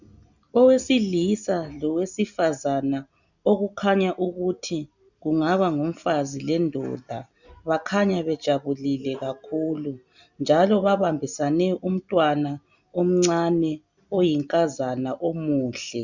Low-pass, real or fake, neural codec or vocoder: 7.2 kHz; real; none